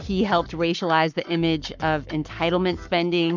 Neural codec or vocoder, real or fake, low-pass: codec, 44.1 kHz, 7.8 kbps, Pupu-Codec; fake; 7.2 kHz